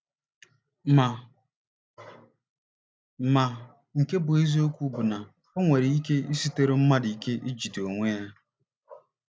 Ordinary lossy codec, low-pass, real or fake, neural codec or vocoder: none; none; real; none